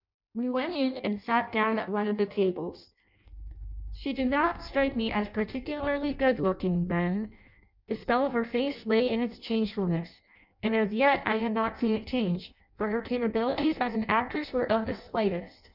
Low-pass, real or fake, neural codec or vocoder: 5.4 kHz; fake; codec, 16 kHz in and 24 kHz out, 0.6 kbps, FireRedTTS-2 codec